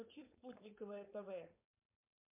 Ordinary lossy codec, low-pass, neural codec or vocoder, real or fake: MP3, 32 kbps; 3.6 kHz; codec, 16 kHz, 4.8 kbps, FACodec; fake